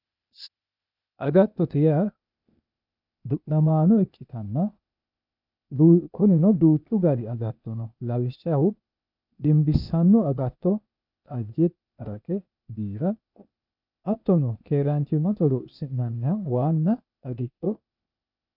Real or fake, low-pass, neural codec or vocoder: fake; 5.4 kHz; codec, 16 kHz, 0.8 kbps, ZipCodec